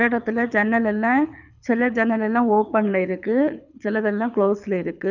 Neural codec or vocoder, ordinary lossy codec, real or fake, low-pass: codec, 24 kHz, 6 kbps, HILCodec; none; fake; 7.2 kHz